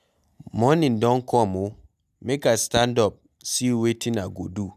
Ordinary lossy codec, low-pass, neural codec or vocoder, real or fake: none; 14.4 kHz; none; real